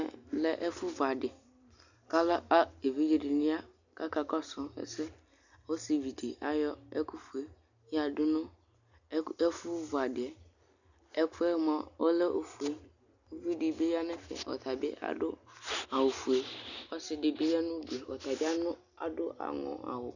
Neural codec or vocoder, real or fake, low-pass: none; real; 7.2 kHz